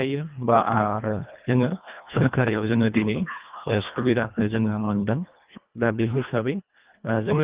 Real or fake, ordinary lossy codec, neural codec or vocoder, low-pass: fake; Opus, 32 kbps; codec, 24 kHz, 1.5 kbps, HILCodec; 3.6 kHz